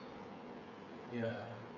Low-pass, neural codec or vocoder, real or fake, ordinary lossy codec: 7.2 kHz; codec, 16 kHz, 8 kbps, FreqCodec, smaller model; fake; none